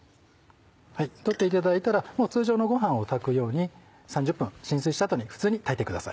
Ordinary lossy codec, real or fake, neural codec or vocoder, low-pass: none; real; none; none